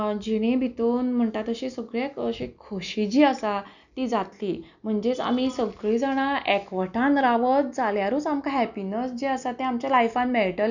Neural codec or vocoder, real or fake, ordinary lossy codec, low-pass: none; real; none; 7.2 kHz